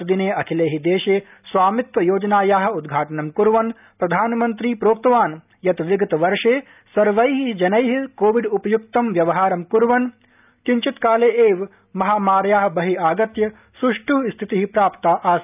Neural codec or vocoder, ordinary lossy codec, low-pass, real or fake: none; none; 3.6 kHz; real